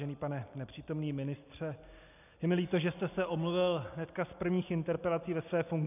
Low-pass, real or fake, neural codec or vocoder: 3.6 kHz; real; none